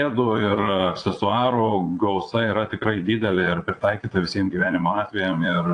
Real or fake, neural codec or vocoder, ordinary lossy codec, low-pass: fake; vocoder, 22.05 kHz, 80 mel bands, Vocos; AAC, 48 kbps; 9.9 kHz